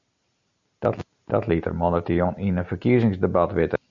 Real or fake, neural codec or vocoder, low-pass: real; none; 7.2 kHz